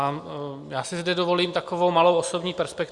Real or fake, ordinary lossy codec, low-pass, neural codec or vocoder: real; Opus, 64 kbps; 10.8 kHz; none